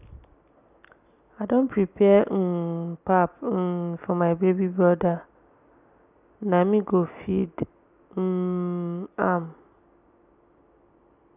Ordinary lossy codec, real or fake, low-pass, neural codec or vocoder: none; real; 3.6 kHz; none